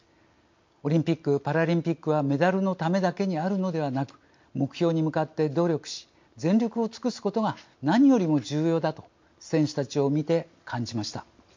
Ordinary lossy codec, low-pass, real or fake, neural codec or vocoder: MP3, 48 kbps; 7.2 kHz; real; none